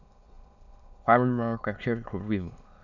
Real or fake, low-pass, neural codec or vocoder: fake; 7.2 kHz; autoencoder, 22.05 kHz, a latent of 192 numbers a frame, VITS, trained on many speakers